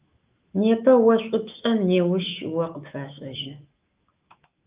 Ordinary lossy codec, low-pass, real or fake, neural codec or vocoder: Opus, 24 kbps; 3.6 kHz; fake; autoencoder, 48 kHz, 128 numbers a frame, DAC-VAE, trained on Japanese speech